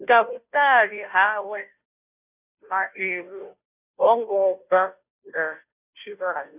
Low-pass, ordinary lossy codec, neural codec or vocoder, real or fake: 3.6 kHz; none; codec, 16 kHz, 0.5 kbps, FunCodec, trained on Chinese and English, 25 frames a second; fake